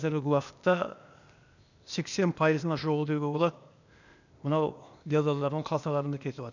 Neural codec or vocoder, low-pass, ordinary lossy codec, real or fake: codec, 16 kHz, 0.8 kbps, ZipCodec; 7.2 kHz; none; fake